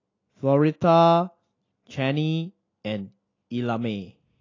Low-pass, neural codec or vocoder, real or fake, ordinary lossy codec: 7.2 kHz; none; real; AAC, 32 kbps